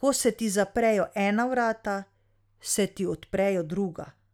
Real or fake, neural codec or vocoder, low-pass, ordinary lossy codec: real; none; 19.8 kHz; none